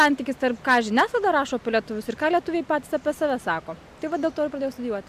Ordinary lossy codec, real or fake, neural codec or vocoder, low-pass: AAC, 96 kbps; real; none; 14.4 kHz